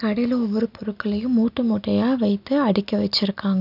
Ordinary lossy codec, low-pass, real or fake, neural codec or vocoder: none; 5.4 kHz; real; none